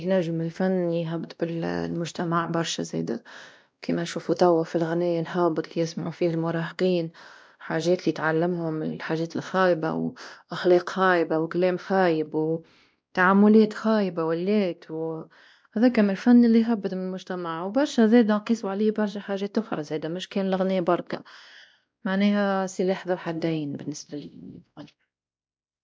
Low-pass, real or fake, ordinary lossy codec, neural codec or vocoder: none; fake; none; codec, 16 kHz, 1 kbps, X-Codec, WavLM features, trained on Multilingual LibriSpeech